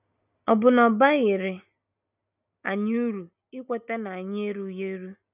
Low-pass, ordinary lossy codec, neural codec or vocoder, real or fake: 3.6 kHz; none; none; real